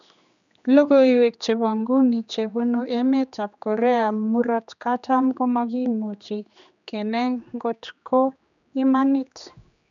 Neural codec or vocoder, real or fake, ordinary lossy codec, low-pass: codec, 16 kHz, 2 kbps, X-Codec, HuBERT features, trained on general audio; fake; none; 7.2 kHz